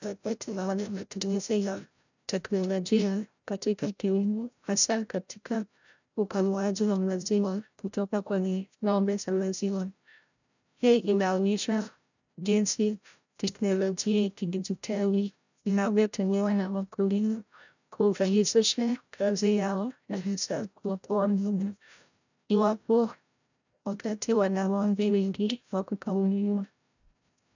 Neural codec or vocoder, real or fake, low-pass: codec, 16 kHz, 0.5 kbps, FreqCodec, larger model; fake; 7.2 kHz